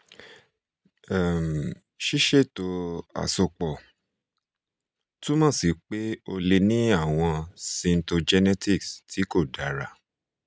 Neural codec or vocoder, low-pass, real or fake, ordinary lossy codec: none; none; real; none